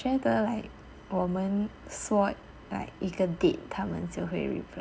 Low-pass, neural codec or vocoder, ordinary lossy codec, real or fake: none; none; none; real